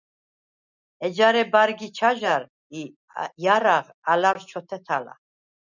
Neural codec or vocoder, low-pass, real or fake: none; 7.2 kHz; real